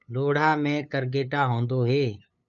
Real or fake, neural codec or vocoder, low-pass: fake; codec, 16 kHz, 8 kbps, FunCodec, trained on LibriTTS, 25 frames a second; 7.2 kHz